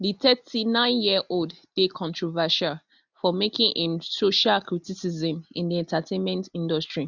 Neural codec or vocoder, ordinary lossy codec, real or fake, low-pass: none; none; real; 7.2 kHz